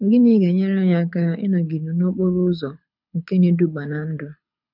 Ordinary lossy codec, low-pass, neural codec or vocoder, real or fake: none; 5.4 kHz; codec, 24 kHz, 6 kbps, HILCodec; fake